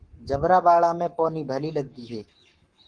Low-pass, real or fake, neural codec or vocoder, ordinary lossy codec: 9.9 kHz; fake; codec, 44.1 kHz, 7.8 kbps, Pupu-Codec; Opus, 24 kbps